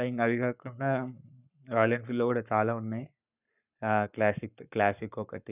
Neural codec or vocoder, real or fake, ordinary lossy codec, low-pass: codec, 44.1 kHz, 7.8 kbps, Pupu-Codec; fake; none; 3.6 kHz